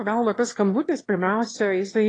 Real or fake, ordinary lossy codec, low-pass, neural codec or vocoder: fake; AAC, 32 kbps; 9.9 kHz; autoencoder, 22.05 kHz, a latent of 192 numbers a frame, VITS, trained on one speaker